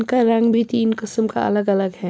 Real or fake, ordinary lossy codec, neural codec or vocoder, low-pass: real; none; none; none